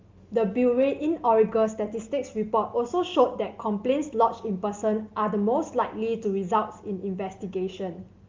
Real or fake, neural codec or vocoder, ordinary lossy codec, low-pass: real; none; Opus, 32 kbps; 7.2 kHz